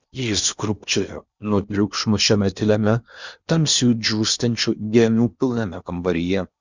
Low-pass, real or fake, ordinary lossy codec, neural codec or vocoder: 7.2 kHz; fake; Opus, 64 kbps; codec, 16 kHz in and 24 kHz out, 0.8 kbps, FocalCodec, streaming, 65536 codes